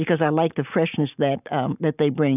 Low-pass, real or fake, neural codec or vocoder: 3.6 kHz; real; none